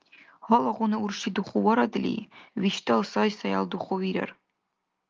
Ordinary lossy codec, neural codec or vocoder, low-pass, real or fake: Opus, 24 kbps; none; 7.2 kHz; real